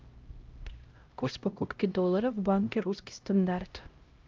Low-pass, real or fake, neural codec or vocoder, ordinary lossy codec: 7.2 kHz; fake; codec, 16 kHz, 0.5 kbps, X-Codec, HuBERT features, trained on LibriSpeech; Opus, 24 kbps